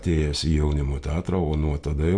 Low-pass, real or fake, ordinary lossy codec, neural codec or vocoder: 9.9 kHz; real; MP3, 64 kbps; none